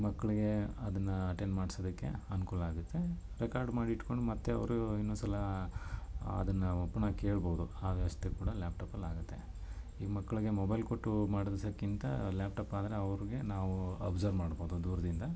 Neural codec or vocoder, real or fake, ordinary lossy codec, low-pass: none; real; none; none